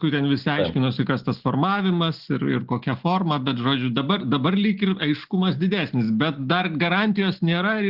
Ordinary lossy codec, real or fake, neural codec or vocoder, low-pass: Opus, 16 kbps; real; none; 5.4 kHz